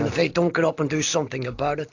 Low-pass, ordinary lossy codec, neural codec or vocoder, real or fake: 7.2 kHz; AAC, 48 kbps; none; real